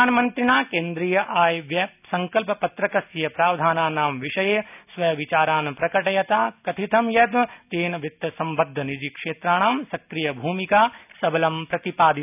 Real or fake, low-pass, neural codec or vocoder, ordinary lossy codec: real; 3.6 kHz; none; none